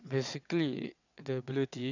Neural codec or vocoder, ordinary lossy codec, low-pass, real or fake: vocoder, 22.05 kHz, 80 mel bands, WaveNeXt; none; 7.2 kHz; fake